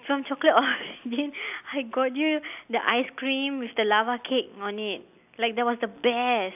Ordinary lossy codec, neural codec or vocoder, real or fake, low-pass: none; none; real; 3.6 kHz